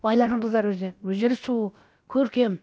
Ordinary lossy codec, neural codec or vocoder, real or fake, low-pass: none; codec, 16 kHz, about 1 kbps, DyCAST, with the encoder's durations; fake; none